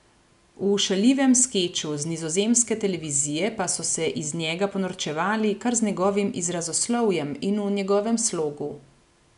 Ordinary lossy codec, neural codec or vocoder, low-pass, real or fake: none; none; 10.8 kHz; real